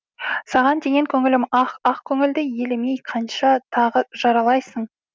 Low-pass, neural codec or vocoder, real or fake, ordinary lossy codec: none; none; real; none